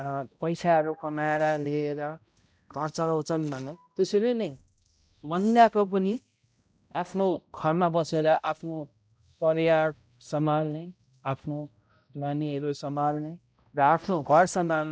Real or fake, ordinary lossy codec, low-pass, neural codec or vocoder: fake; none; none; codec, 16 kHz, 0.5 kbps, X-Codec, HuBERT features, trained on balanced general audio